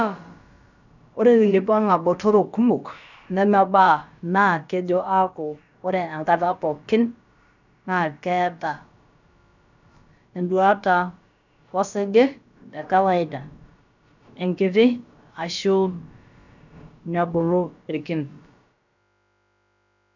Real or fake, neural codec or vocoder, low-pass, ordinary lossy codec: fake; codec, 16 kHz, about 1 kbps, DyCAST, with the encoder's durations; 7.2 kHz; none